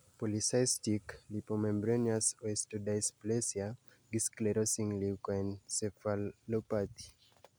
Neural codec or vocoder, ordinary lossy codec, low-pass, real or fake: none; none; none; real